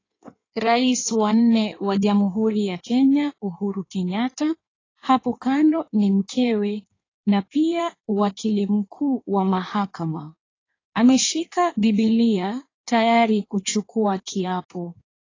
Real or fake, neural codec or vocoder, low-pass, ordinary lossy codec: fake; codec, 16 kHz in and 24 kHz out, 1.1 kbps, FireRedTTS-2 codec; 7.2 kHz; AAC, 32 kbps